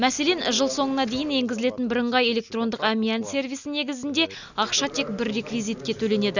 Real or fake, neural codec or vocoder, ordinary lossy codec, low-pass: real; none; none; 7.2 kHz